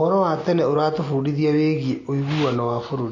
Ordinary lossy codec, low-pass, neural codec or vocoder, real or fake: MP3, 32 kbps; 7.2 kHz; none; real